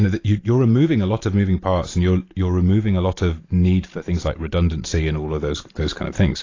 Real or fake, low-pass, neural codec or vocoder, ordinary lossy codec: real; 7.2 kHz; none; AAC, 32 kbps